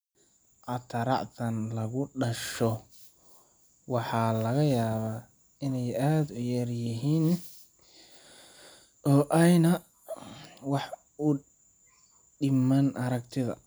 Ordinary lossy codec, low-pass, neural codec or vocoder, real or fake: none; none; none; real